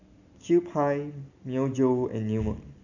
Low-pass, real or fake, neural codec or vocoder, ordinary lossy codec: 7.2 kHz; real; none; none